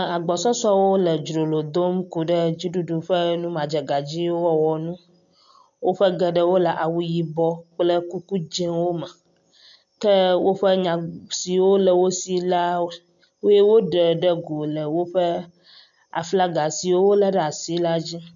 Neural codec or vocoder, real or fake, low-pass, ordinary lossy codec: none; real; 7.2 kHz; MP3, 64 kbps